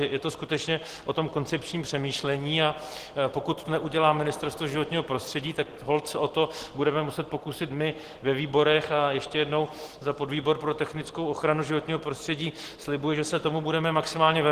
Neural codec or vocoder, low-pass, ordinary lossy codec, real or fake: none; 14.4 kHz; Opus, 16 kbps; real